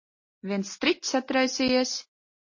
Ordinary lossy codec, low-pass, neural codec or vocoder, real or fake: MP3, 32 kbps; 7.2 kHz; none; real